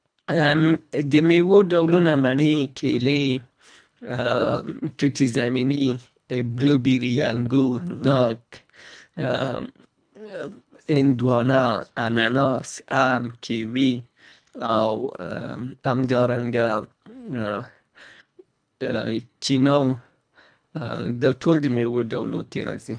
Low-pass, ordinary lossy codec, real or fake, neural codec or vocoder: 9.9 kHz; none; fake; codec, 24 kHz, 1.5 kbps, HILCodec